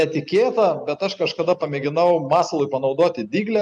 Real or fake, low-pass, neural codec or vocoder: real; 10.8 kHz; none